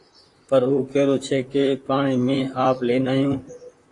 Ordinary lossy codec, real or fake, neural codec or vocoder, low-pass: AAC, 48 kbps; fake; vocoder, 44.1 kHz, 128 mel bands, Pupu-Vocoder; 10.8 kHz